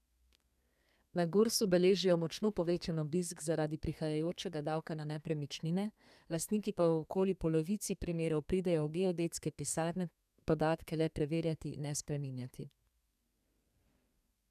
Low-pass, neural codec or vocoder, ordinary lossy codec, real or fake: 14.4 kHz; codec, 32 kHz, 1.9 kbps, SNAC; none; fake